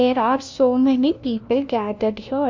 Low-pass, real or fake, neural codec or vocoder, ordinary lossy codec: 7.2 kHz; fake; codec, 16 kHz, 1 kbps, FunCodec, trained on LibriTTS, 50 frames a second; MP3, 48 kbps